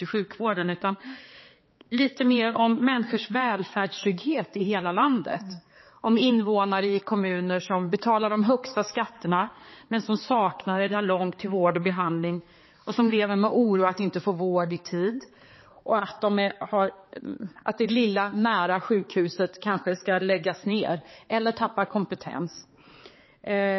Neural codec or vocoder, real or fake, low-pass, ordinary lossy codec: codec, 16 kHz, 4 kbps, X-Codec, HuBERT features, trained on balanced general audio; fake; 7.2 kHz; MP3, 24 kbps